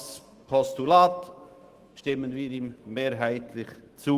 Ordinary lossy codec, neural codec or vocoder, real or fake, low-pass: Opus, 24 kbps; autoencoder, 48 kHz, 128 numbers a frame, DAC-VAE, trained on Japanese speech; fake; 14.4 kHz